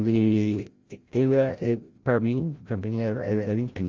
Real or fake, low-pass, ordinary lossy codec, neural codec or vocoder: fake; 7.2 kHz; Opus, 32 kbps; codec, 16 kHz, 0.5 kbps, FreqCodec, larger model